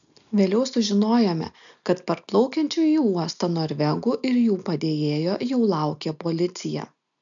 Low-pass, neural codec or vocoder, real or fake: 7.2 kHz; none; real